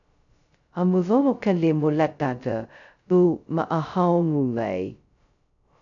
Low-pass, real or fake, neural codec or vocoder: 7.2 kHz; fake; codec, 16 kHz, 0.2 kbps, FocalCodec